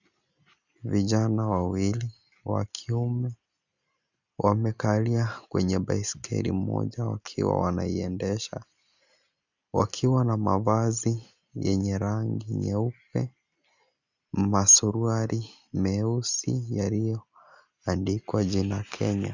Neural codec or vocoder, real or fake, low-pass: none; real; 7.2 kHz